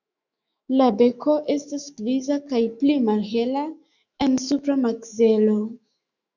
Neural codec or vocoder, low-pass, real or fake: autoencoder, 48 kHz, 128 numbers a frame, DAC-VAE, trained on Japanese speech; 7.2 kHz; fake